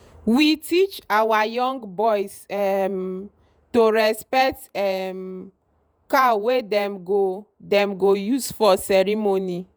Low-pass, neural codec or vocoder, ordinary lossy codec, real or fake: none; vocoder, 48 kHz, 128 mel bands, Vocos; none; fake